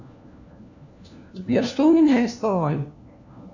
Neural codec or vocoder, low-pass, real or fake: codec, 16 kHz, 1 kbps, FunCodec, trained on LibriTTS, 50 frames a second; 7.2 kHz; fake